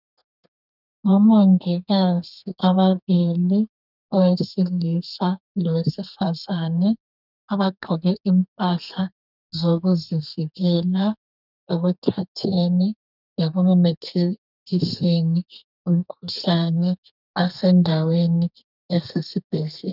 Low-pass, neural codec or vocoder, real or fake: 5.4 kHz; codec, 32 kHz, 1.9 kbps, SNAC; fake